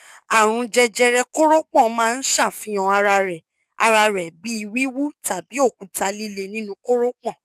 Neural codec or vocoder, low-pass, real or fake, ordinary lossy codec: codec, 44.1 kHz, 7.8 kbps, DAC; 14.4 kHz; fake; none